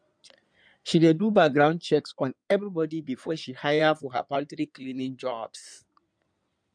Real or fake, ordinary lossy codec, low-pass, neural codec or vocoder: fake; none; 9.9 kHz; codec, 16 kHz in and 24 kHz out, 2.2 kbps, FireRedTTS-2 codec